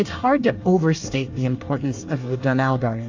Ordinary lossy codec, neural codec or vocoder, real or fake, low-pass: AAC, 48 kbps; codec, 24 kHz, 1 kbps, SNAC; fake; 7.2 kHz